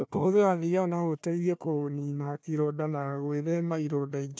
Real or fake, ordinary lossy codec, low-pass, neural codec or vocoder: fake; none; none; codec, 16 kHz, 1 kbps, FunCodec, trained on Chinese and English, 50 frames a second